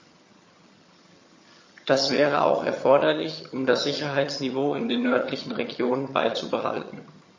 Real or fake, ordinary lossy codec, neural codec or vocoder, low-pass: fake; MP3, 32 kbps; vocoder, 22.05 kHz, 80 mel bands, HiFi-GAN; 7.2 kHz